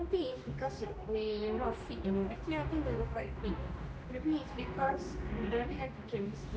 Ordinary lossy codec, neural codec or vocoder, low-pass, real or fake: none; codec, 16 kHz, 1 kbps, X-Codec, HuBERT features, trained on general audio; none; fake